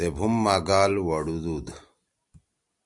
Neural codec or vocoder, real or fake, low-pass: none; real; 10.8 kHz